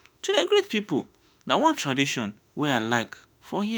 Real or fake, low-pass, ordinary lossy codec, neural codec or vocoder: fake; none; none; autoencoder, 48 kHz, 32 numbers a frame, DAC-VAE, trained on Japanese speech